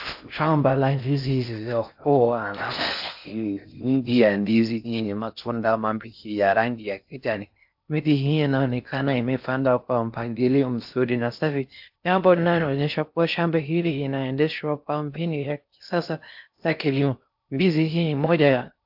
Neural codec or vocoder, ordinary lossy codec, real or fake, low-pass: codec, 16 kHz in and 24 kHz out, 0.6 kbps, FocalCodec, streaming, 4096 codes; AAC, 48 kbps; fake; 5.4 kHz